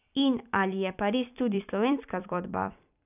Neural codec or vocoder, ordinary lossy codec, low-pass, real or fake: vocoder, 44.1 kHz, 128 mel bands every 256 samples, BigVGAN v2; none; 3.6 kHz; fake